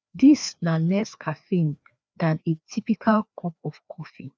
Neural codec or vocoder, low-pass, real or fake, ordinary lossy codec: codec, 16 kHz, 2 kbps, FreqCodec, larger model; none; fake; none